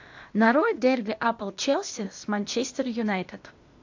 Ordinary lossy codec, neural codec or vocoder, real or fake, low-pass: AAC, 48 kbps; codec, 16 kHz, 0.8 kbps, ZipCodec; fake; 7.2 kHz